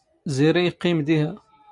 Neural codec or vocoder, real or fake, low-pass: none; real; 10.8 kHz